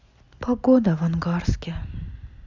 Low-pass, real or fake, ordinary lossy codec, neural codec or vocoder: 7.2 kHz; real; Opus, 64 kbps; none